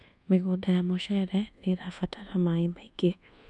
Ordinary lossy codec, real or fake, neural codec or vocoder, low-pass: none; fake; codec, 24 kHz, 1.2 kbps, DualCodec; 10.8 kHz